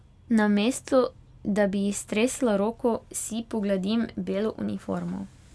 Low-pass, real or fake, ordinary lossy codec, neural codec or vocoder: none; real; none; none